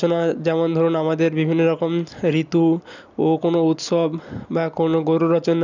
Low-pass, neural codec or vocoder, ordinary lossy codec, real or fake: 7.2 kHz; none; none; real